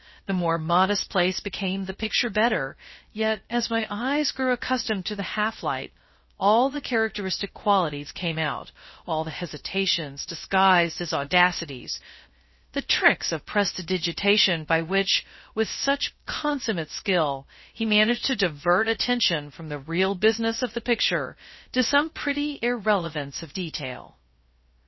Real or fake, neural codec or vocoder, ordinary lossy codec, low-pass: fake; codec, 16 kHz, 0.3 kbps, FocalCodec; MP3, 24 kbps; 7.2 kHz